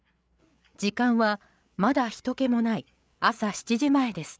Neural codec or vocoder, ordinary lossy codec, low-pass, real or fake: codec, 16 kHz, 8 kbps, FreqCodec, larger model; none; none; fake